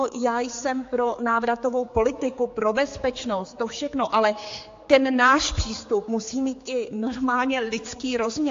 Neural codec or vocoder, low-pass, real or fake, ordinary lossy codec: codec, 16 kHz, 4 kbps, X-Codec, HuBERT features, trained on general audio; 7.2 kHz; fake; AAC, 48 kbps